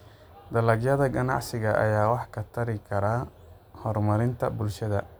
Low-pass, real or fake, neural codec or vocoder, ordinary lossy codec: none; real; none; none